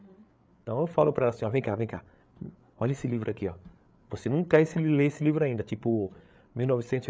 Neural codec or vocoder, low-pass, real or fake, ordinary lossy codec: codec, 16 kHz, 8 kbps, FreqCodec, larger model; none; fake; none